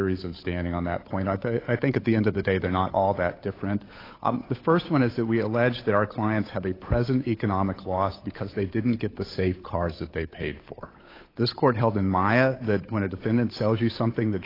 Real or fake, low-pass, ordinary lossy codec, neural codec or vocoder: fake; 5.4 kHz; AAC, 24 kbps; codec, 16 kHz, 8 kbps, FunCodec, trained on Chinese and English, 25 frames a second